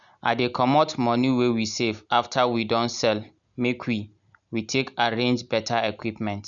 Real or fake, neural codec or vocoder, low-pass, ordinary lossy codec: real; none; 7.2 kHz; none